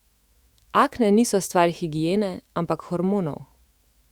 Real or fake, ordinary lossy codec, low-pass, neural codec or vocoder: fake; Opus, 64 kbps; 19.8 kHz; autoencoder, 48 kHz, 128 numbers a frame, DAC-VAE, trained on Japanese speech